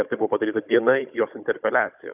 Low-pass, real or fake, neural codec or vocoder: 3.6 kHz; fake; codec, 16 kHz, 16 kbps, FunCodec, trained on Chinese and English, 50 frames a second